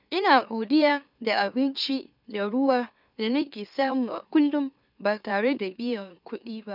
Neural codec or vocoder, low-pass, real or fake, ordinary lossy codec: autoencoder, 44.1 kHz, a latent of 192 numbers a frame, MeloTTS; 5.4 kHz; fake; none